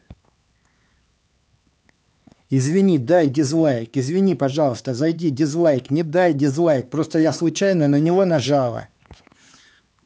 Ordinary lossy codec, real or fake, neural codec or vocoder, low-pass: none; fake; codec, 16 kHz, 4 kbps, X-Codec, HuBERT features, trained on LibriSpeech; none